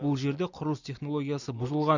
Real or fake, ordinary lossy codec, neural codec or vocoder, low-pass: real; none; none; 7.2 kHz